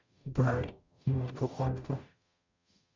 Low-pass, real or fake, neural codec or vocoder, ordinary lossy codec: 7.2 kHz; fake; codec, 44.1 kHz, 0.9 kbps, DAC; MP3, 64 kbps